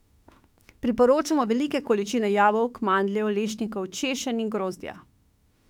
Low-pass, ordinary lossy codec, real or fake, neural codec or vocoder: 19.8 kHz; none; fake; autoencoder, 48 kHz, 32 numbers a frame, DAC-VAE, trained on Japanese speech